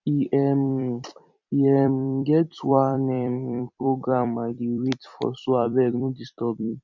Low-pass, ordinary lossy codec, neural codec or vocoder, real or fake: 7.2 kHz; none; vocoder, 44.1 kHz, 128 mel bands every 256 samples, BigVGAN v2; fake